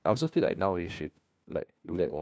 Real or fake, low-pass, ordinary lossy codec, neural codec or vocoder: fake; none; none; codec, 16 kHz, 1 kbps, FunCodec, trained on LibriTTS, 50 frames a second